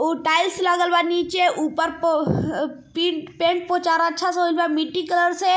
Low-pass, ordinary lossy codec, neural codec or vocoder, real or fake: none; none; none; real